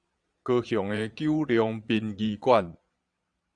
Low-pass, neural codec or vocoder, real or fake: 9.9 kHz; vocoder, 22.05 kHz, 80 mel bands, Vocos; fake